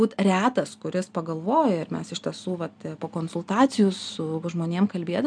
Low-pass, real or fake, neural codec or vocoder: 9.9 kHz; real; none